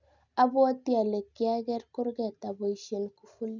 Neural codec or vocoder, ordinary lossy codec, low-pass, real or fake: none; none; 7.2 kHz; real